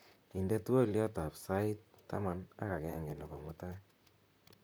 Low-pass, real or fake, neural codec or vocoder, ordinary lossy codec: none; fake; vocoder, 44.1 kHz, 128 mel bands, Pupu-Vocoder; none